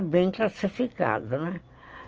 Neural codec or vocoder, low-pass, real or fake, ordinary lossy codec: none; 7.2 kHz; real; Opus, 24 kbps